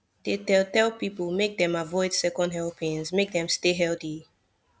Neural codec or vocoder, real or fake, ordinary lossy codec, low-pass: none; real; none; none